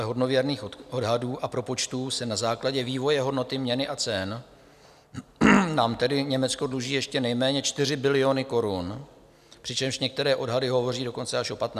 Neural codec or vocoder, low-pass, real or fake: none; 14.4 kHz; real